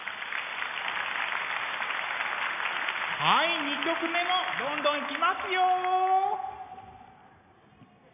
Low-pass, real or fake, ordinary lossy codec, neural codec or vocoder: 3.6 kHz; real; none; none